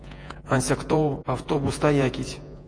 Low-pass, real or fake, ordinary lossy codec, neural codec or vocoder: 9.9 kHz; fake; AAC, 48 kbps; vocoder, 48 kHz, 128 mel bands, Vocos